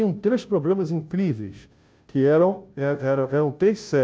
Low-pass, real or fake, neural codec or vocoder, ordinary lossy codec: none; fake; codec, 16 kHz, 0.5 kbps, FunCodec, trained on Chinese and English, 25 frames a second; none